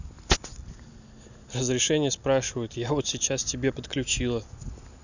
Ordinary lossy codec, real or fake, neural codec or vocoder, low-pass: none; real; none; 7.2 kHz